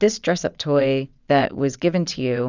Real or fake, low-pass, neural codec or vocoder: fake; 7.2 kHz; vocoder, 22.05 kHz, 80 mel bands, WaveNeXt